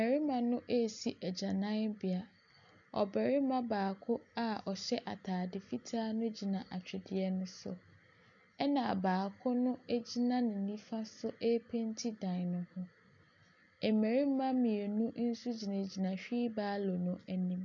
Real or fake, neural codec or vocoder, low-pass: real; none; 7.2 kHz